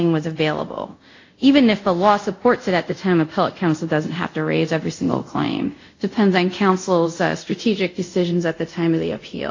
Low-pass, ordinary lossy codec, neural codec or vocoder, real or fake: 7.2 kHz; AAC, 48 kbps; codec, 24 kHz, 0.5 kbps, DualCodec; fake